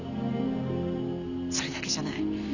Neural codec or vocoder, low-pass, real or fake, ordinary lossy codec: none; 7.2 kHz; real; none